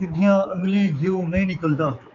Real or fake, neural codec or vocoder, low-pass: fake; codec, 16 kHz, 4 kbps, X-Codec, HuBERT features, trained on general audio; 7.2 kHz